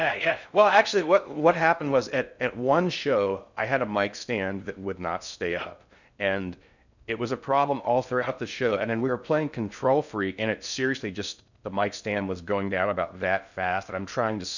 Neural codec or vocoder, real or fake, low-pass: codec, 16 kHz in and 24 kHz out, 0.6 kbps, FocalCodec, streaming, 4096 codes; fake; 7.2 kHz